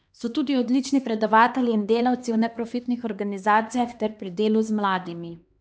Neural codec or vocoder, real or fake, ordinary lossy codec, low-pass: codec, 16 kHz, 2 kbps, X-Codec, HuBERT features, trained on LibriSpeech; fake; none; none